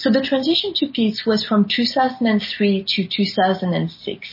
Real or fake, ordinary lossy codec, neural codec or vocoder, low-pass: real; MP3, 24 kbps; none; 5.4 kHz